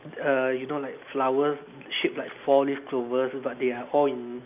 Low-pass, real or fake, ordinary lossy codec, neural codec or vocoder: 3.6 kHz; real; none; none